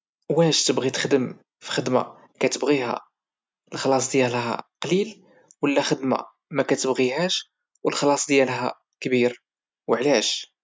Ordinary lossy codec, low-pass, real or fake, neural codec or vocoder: none; none; real; none